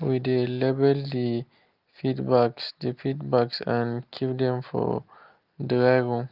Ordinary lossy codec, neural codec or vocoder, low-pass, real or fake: Opus, 32 kbps; none; 5.4 kHz; real